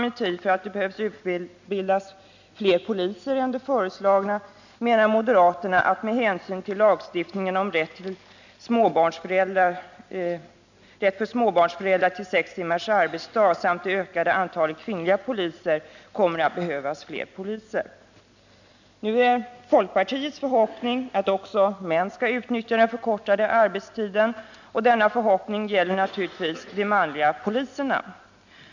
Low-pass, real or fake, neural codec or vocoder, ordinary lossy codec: 7.2 kHz; real; none; none